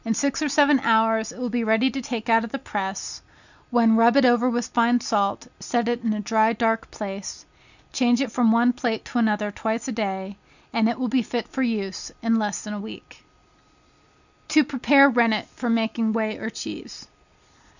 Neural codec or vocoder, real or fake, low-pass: none; real; 7.2 kHz